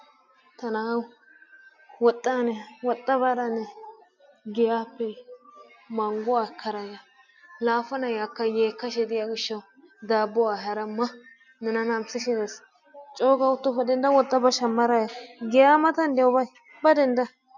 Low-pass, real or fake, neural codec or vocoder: 7.2 kHz; real; none